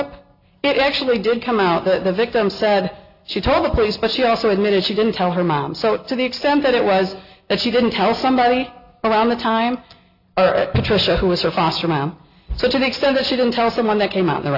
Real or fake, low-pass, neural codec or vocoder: real; 5.4 kHz; none